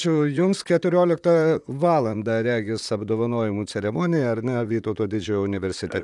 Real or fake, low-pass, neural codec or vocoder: fake; 10.8 kHz; vocoder, 44.1 kHz, 128 mel bands, Pupu-Vocoder